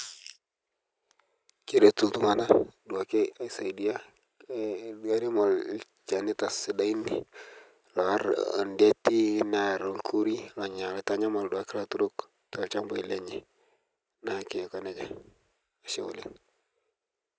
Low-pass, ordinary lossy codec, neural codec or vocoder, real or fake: none; none; none; real